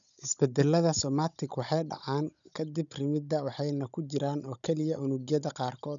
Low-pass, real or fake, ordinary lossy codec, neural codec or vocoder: 7.2 kHz; fake; none; codec, 16 kHz, 16 kbps, FunCodec, trained on Chinese and English, 50 frames a second